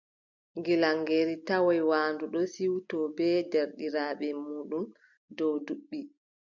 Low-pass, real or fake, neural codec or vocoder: 7.2 kHz; real; none